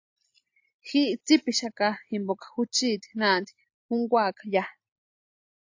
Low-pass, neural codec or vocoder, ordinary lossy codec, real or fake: 7.2 kHz; none; AAC, 48 kbps; real